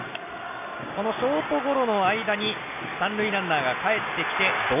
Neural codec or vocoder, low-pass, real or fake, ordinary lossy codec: none; 3.6 kHz; real; none